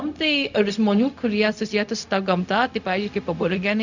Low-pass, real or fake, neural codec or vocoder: 7.2 kHz; fake; codec, 16 kHz, 0.4 kbps, LongCat-Audio-Codec